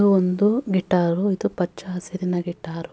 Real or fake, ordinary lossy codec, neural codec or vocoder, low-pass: real; none; none; none